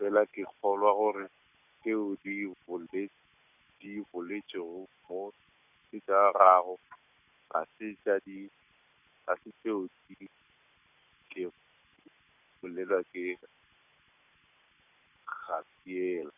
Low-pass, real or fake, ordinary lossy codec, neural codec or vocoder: 3.6 kHz; real; none; none